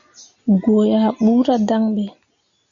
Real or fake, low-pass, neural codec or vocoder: real; 7.2 kHz; none